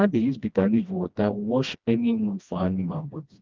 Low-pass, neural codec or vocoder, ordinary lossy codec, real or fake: 7.2 kHz; codec, 16 kHz, 1 kbps, FreqCodec, smaller model; Opus, 32 kbps; fake